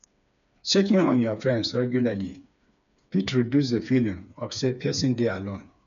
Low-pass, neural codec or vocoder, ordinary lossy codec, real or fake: 7.2 kHz; codec, 16 kHz, 4 kbps, FreqCodec, smaller model; MP3, 96 kbps; fake